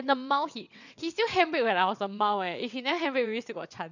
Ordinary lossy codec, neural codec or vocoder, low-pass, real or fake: none; vocoder, 22.05 kHz, 80 mel bands, WaveNeXt; 7.2 kHz; fake